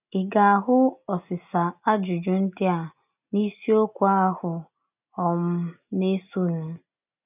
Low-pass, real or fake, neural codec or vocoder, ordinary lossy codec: 3.6 kHz; real; none; none